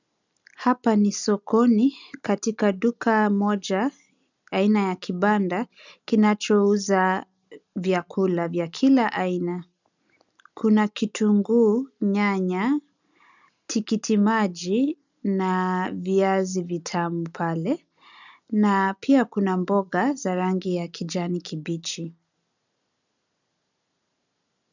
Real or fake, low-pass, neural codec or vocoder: real; 7.2 kHz; none